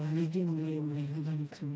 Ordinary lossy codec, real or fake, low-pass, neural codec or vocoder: none; fake; none; codec, 16 kHz, 1 kbps, FreqCodec, smaller model